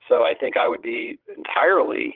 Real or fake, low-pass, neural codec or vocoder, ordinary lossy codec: fake; 5.4 kHz; vocoder, 22.05 kHz, 80 mel bands, Vocos; Opus, 16 kbps